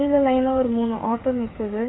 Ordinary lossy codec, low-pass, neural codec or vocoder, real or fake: AAC, 16 kbps; 7.2 kHz; codec, 16 kHz, 8 kbps, FreqCodec, smaller model; fake